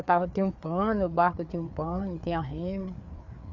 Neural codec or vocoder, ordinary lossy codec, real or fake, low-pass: codec, 16 kHz, 4 kbps, FreqCodec, larger model; Opus, 64 kbps; fake; 7.2 kHz